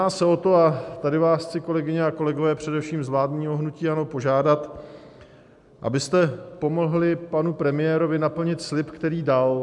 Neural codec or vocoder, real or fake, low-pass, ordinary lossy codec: none; real; 10.8 kHz; MP3, 96 kbps